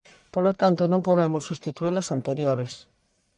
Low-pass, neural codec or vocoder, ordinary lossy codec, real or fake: 10.8 kHz; codec, 44.1 kHz, 1.7 kbps, Pupu-Codec; MP3, 96 kbps; fake